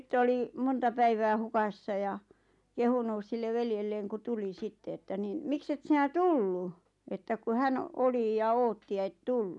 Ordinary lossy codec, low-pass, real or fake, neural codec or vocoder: none; 10.8 kHz; real; none